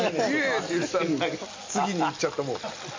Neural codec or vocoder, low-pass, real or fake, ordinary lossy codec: none; 7.2 kHz; real; MP3, 48 kbps